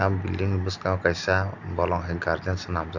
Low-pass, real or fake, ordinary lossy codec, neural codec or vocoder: 7.2 kHz; real; none; none